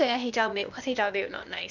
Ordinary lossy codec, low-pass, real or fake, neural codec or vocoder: none; 7.2 kHz; fake; codec, 16 kHz, 0.8 kbps, ZipCodec